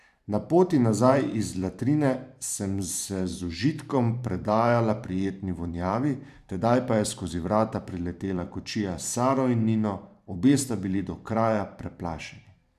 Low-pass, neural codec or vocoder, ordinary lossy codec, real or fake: 14.4 kHz; vocoder, 44.1 kHz, 128 mel bands every 512 samples, BigVGAN v2; none; fake